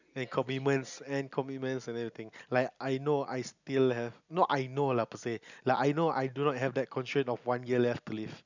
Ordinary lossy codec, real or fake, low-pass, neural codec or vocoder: none; real; 7.2 kHz; none